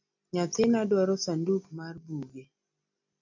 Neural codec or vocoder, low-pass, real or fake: none; 7.2 kHz; real